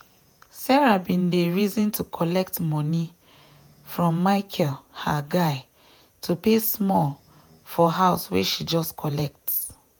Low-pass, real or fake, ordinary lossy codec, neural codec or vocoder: none; fake; none; vocoder, 48 kHz, 128 mel bands, Vocos